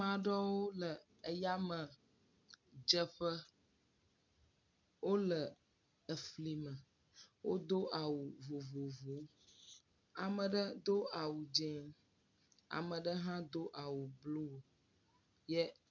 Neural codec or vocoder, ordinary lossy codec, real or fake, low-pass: none; MP3, 64 kbps; real; 7.2 kHz